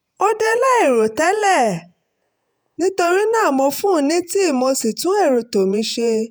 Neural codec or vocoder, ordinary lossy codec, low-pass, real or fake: vocoder, 48 kHz, 128 mel bands, Vocos; none; none; fake